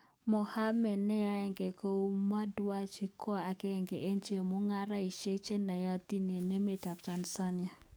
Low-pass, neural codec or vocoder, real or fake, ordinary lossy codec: none; codec, 44.1 kHz, 7.8 kbps, DAC; fake; none